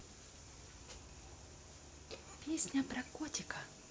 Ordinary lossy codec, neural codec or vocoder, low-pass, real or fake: none; none; none; real